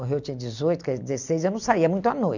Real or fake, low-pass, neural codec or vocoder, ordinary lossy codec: real; 7.2 kHz; none; none